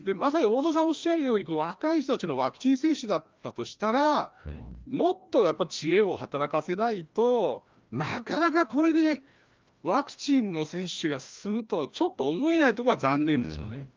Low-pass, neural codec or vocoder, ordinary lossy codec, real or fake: 7.2 kHz; codec, 16 kHz, 1 kbps, FreqCodec, larger model; Opus, 24 kbps; fake